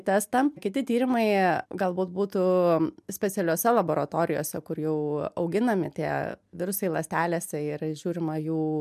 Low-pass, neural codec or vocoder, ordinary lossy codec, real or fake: 14.4 kHz; none; MP3, 96 kbps; real